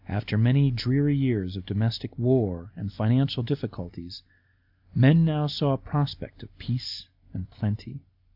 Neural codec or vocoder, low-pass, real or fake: none; 5.4 kHz; real